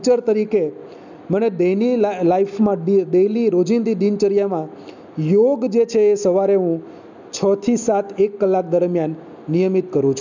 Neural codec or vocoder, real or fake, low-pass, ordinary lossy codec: none; real; 7.2 kHz; none